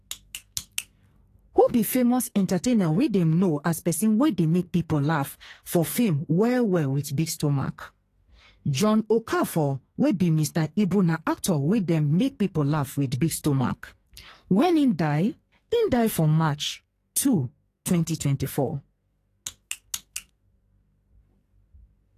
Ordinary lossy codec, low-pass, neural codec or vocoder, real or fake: AAC, 48 kbps; 14.4 kHz; codec, 44.1 kHz, 2.6 kbps, SNAC; fake